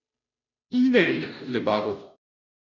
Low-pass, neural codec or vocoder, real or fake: 7.2 kHz; codec, 16 kHz, 0.5 kbps, FunCodec, trained on Chinese and English, 25 frames a second; fake